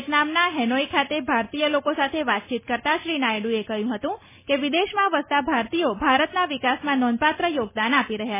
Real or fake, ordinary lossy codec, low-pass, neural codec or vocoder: real; MP3, 16 kbps; 3.6 kHz; none